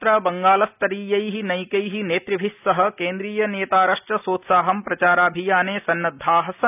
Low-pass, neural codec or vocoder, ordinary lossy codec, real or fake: 3.6 kHz; none; none; real